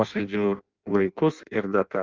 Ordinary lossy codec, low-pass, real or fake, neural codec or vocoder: Opus, 32 kbps; 7.2 kHz; fake; codec, 16 kHz in and 24 kHz out, 0.6 kbps, FireRedTTS-2 codec